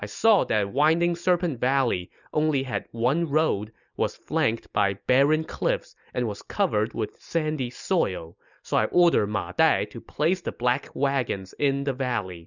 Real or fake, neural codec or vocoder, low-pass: real; none; 7.2 kHz